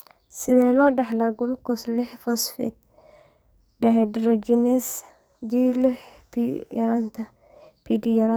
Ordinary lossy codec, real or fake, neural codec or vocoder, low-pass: none; fake; codec, 44.1 kHz, 2.6 kbps, SNAC; none